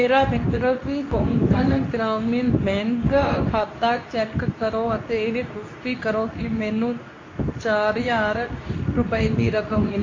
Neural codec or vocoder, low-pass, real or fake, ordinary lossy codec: codec, 24 kHz, 0.9 kbps, WavTokenizer, medium speech release version 2; 7.2 kHz; fake; AAC, 32 kbps